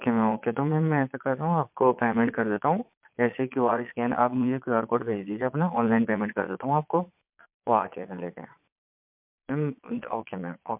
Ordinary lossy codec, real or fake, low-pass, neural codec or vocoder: MP3, 32 kbps; fake; 3.6 kHz; vocoder, 22.05 kHz, 80 mel bands, Vocos